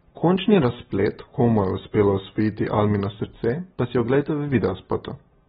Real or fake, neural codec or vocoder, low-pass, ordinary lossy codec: real; none; 19.8 kHz; AAC, 16 kbps